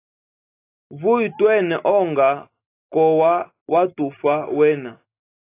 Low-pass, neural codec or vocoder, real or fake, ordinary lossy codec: 3.6 kHz; none; real; AAC, 24 kbps